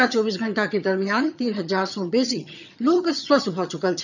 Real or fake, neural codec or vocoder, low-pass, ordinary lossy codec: fake; vocoder, 22.05 kHz, 80 mel bands, HiFi-GAN; 7.2 kHz; none